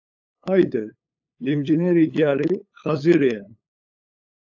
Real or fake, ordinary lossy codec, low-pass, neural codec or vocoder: fake; AAC, 48 kbps; 7.2 kHz; codec, 16 kHz, 8 kbps, FunCodec, trained on LibriTTS, 25 frames a second